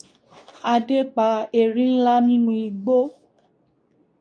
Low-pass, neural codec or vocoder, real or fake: 9.9 kHz; codec, 24 kHz, 0.9 kbps, WavTokenizer, medium speech release version 2; fake